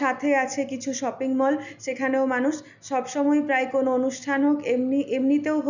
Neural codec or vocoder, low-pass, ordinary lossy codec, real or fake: none; 7.2 kHz; none; real